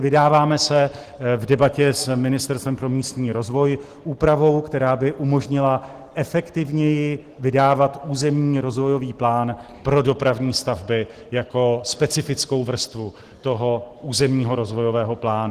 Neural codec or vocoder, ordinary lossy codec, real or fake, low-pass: none; Opus, 16 kbps; real; 14.4 kHz